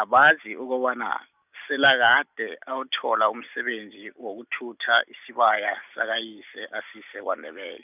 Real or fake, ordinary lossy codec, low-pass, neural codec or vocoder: real; none; 3.6 kHz; none